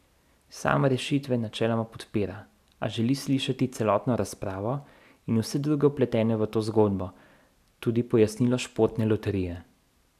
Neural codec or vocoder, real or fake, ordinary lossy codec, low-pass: none; real; none; 14.4 kHz